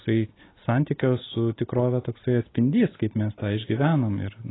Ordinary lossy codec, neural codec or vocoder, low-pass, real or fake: AAC, 16 kbps; none; 7.2 kHz; real